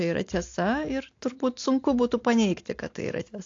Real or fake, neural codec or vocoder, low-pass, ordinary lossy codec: real; none; 7.2 kHz; AAC, 48 kbps